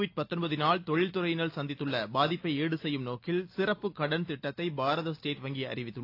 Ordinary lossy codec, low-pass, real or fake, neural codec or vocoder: AAC, 32 kbps; 5.4 kHz; real; none